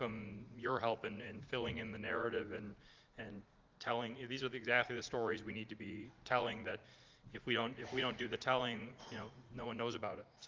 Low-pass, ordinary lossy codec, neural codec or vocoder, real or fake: 7.2 kHz; Opus, 24 kbps; vocoder, 44.1 kHz, 80 mel bands, Vocos; fake